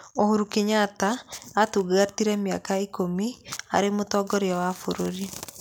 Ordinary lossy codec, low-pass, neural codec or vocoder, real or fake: none; none; none; real